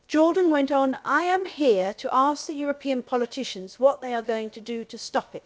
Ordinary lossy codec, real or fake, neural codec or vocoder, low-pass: none; fake; codec, 16 kHz, about 1 kbps, DyCAST, with the encoder's durations; none